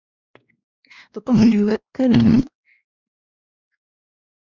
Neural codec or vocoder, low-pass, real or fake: codec, 16 kHz, 1 kbps, X-Codec, WavLM features, trained on Multilingual LibriSpeech; 7.2 kHz; fake